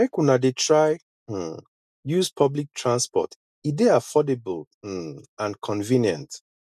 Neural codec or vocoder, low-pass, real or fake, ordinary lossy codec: none; 14.4 kHz; real; none